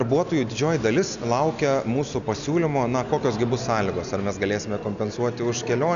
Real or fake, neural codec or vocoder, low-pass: real; none; 7.2 kHz